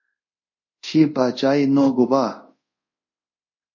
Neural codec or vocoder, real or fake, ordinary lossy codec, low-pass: codec, 24 kHz, 0.5 kbps, DualCodec; fake; MP3, 32 kbps; 7.2 kHz